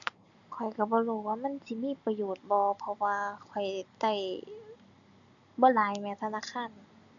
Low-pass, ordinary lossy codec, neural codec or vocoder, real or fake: 7.2 kHz; none; none; real